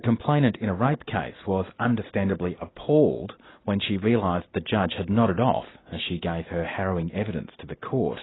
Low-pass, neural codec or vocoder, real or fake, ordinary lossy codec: 7.2 kHz; none; real; AAC, 16 kbps